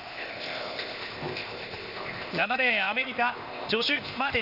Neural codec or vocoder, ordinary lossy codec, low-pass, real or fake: codec, 16 kHz, 0.8 kbps, ZipCodec; none; 5.4 kHz; fake